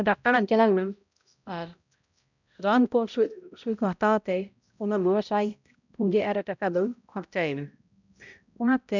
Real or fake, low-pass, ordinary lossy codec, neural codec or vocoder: fake; 7.2 kHz; none; codec, 16 kHz, 0.5 kbps, X-Codec, HuBERT features, trained on balanced general audio